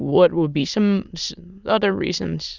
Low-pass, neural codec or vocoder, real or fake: 7.2 kHz; autoencoder, 22.05 kHz, a latent of 192 numbers a frame, VITS, trained on many speakers; fake